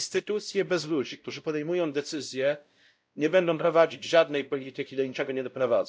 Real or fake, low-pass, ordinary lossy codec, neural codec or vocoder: fake; none; none; codec, 16 kHz, 0.5 kbps, X-Codec, WavLM features, trained on Multilingual LibriSpeech